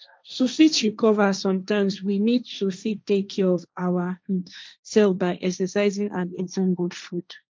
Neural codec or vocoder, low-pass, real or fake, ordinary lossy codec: codec, 16 kHz, 1.1 kbps, Voila-Tokenizer; none; fake; none